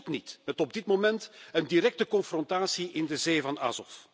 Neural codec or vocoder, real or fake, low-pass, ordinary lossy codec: none; real; none; none